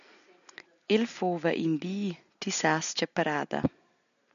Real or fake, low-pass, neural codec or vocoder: real; 7.2 kHz; none